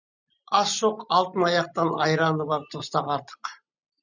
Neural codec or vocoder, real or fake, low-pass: none; real; 7.2 kHz